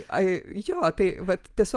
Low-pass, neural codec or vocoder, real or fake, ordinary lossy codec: 10.8 kHz; none; real; Opus, 24 kbps